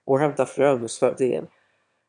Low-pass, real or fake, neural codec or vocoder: 9.9 kHz; fake; autoencoder, 22.05 kHz, a latent of 192 numbers a frame, VITS, trained on one speaker